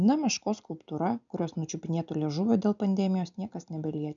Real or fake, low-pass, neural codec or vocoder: real; 7.2 kHz; none